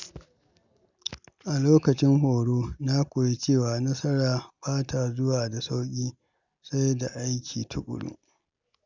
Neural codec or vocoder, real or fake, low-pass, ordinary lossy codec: none; real; 7.2 kHz; none